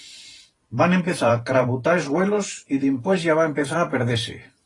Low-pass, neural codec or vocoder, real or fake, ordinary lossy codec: 10.8 kHz; vocoder, 44.1 kHz, 128 mel bands every 512 samples, BigVGAN v2; fake; AAC, 32 kbps